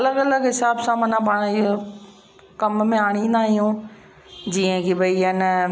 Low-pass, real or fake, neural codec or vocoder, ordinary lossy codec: none; real; none; none